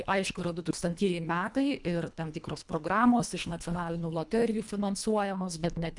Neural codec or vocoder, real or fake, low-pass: codec, 24 kHz, 1.5 kbps, HILCodec; fake; 10.8 kHz